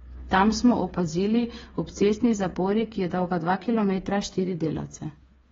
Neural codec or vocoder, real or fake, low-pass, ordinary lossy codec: codec, 16 kHz, 8 kbps, FreqCodec, smaller model; fake; 7.2 kHz; AAC, 24 kbps